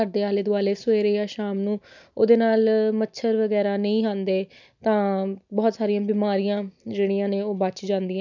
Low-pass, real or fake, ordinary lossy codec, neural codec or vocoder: 7.2 kHz; real; none; none